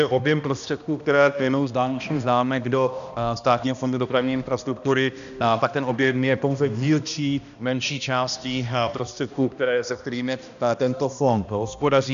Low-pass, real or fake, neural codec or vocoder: 7.2 kHz; fake; codec, 16 kHz, 1 kbps, X-Codec, HuBERT features, trained on balanced general audio